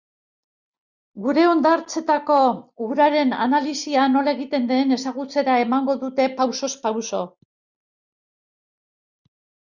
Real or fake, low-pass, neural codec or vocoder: real; 7.2 kHz; none